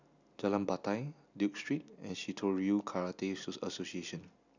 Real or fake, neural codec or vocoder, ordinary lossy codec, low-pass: real; none; none; 7.2 kHz